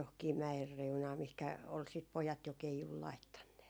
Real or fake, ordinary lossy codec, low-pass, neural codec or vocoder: real; none; none; none